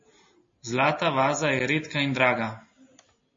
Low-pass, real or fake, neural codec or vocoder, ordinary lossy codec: 7.2 kHz; real; none; MP3, 32 kbps